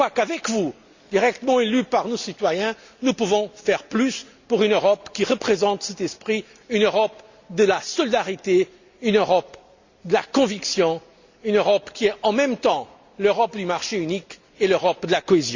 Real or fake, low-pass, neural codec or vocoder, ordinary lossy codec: real; 7.2 kHz; none; Opus, 64 kbps